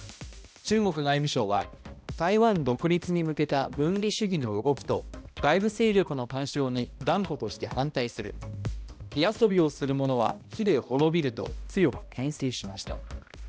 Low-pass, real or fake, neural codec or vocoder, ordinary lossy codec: none; fake; codec, 16 kHz, 1 kbps, X-Codec, HuBERT features, trained on balanced general audio; none